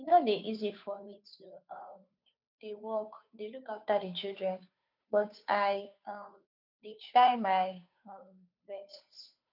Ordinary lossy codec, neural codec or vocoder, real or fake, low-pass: none; codec, 16 kHz, 2 kbps, FunCodec, trained on Chinese and English, 25 frames a second; fake; 5.4 kHz